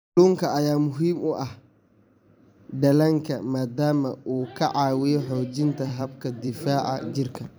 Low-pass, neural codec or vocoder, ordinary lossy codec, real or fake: none; vocoder, 44.1 kHz, 128 mel bands every 256 samples, BigVGAN v2; none; fake